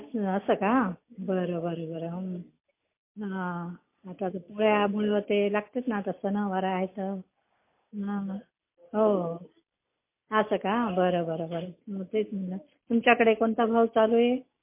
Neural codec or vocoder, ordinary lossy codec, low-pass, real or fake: none; MP3, 24 kbps; 3.6 kHz; real